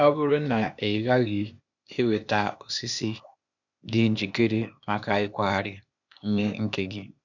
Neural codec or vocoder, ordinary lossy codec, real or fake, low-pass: codec, 16 kHz, 0.8 kbps, ZipCodec; none; fake; 7.2 kHz